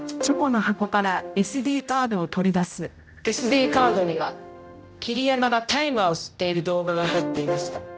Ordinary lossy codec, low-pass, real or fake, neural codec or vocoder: none; none; fake; codec, 16 kHz, 0.5 kbps, X-Codec, HuBERT features, trained on general audio